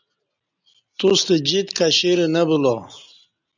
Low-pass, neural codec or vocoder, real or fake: 7.2 kHz; none; real